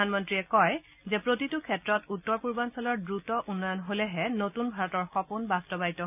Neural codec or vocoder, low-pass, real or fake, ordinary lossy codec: none; 3.6 kHz; real; none